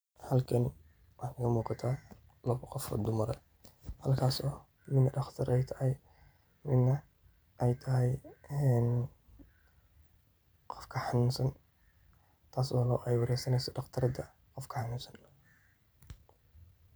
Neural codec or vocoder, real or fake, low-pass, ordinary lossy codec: vocoder, 44.1 kHz, 128 mel bands every 512 samples, BigVGAN v2; fake; none; none